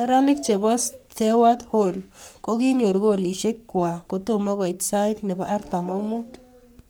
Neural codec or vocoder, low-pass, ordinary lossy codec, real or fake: codec, 44.1 kHz, 3.4 kbps, Pupu-Codec; none; none; fake